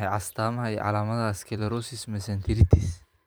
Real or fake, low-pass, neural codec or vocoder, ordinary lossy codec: fake; none; vocoder, 44.1 kHz, 128 mel bands every 512 samples, BigVGAN v2; none